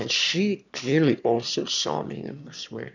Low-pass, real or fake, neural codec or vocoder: 7.2 kHz; fake; autoencoder, 22.05 kHz, a latent of 192 numbers a frame, VITS, trained on one speaker